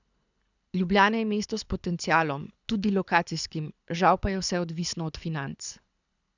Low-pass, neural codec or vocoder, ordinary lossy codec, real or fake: 7.2 kHz; codec, 24 kHz, 6 kbps, HILCodec; none; fake